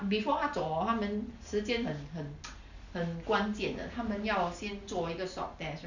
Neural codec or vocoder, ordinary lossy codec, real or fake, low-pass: none; none; real; 7.2 kHz